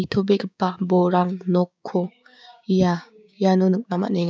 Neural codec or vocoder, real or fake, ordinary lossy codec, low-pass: codec, 16 kHz, 6 kbps, DAC; fake; none; none